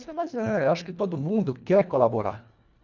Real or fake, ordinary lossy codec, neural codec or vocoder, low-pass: fake; none; codec, 24 kHz, 1.5 kbps, HILCodec; 7.2 kHz